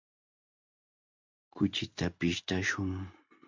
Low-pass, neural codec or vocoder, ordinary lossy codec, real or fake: 7.2 kHz; none; MP3, 64 kbps; real